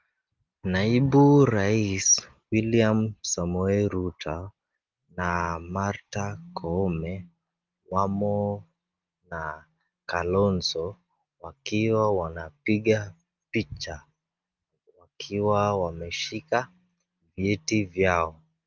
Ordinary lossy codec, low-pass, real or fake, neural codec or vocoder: Opus, 24 kbps; 7.2 kHz; real; none